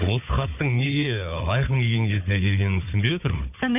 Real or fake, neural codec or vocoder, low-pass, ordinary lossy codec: fake; codec, 16 kHz, 4 kbps, FunCodec, trained on Chinese and English, 50 frames a second; 3.6 kHz; none